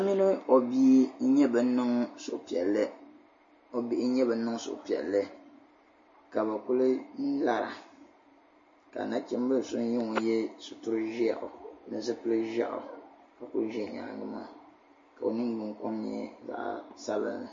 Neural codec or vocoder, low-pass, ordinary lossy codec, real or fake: none; 7.2 kHz; MP3, 32 kbps; real